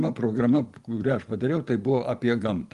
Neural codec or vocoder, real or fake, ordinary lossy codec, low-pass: vocoder, 24 kHz, 100 mel bands, Vocos; fake; Opus, 24 kbps; 10.8 kHz